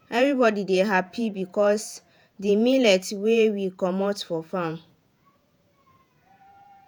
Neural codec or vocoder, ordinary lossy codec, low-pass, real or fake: vocoder, 48 kHz, 128 mel bands, Vocos; none; none; fake